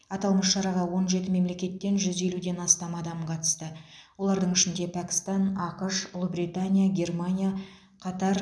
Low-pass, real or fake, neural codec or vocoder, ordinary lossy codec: none; real; none; none